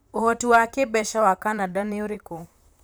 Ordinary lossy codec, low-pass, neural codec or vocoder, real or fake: none; none; vocoder, 44.1 kHz, 128 mel bands, Pupu-Vocoder; fake